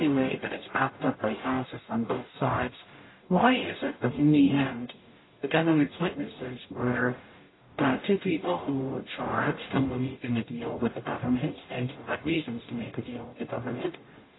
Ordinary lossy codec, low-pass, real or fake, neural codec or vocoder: AAC, 16 kbps; 7.2 kHz; fake; codec, 44.1 kHz, 0.9 kbps, DAC